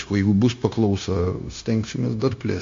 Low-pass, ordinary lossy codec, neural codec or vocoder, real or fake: 7.2 kHz; MP3, 48 kbps; codec, 16 kHz, 0.9 kbps, LongCat-Audio-Codec; fake